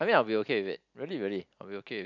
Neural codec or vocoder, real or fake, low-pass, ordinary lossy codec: none; real; 7.2 kHz; none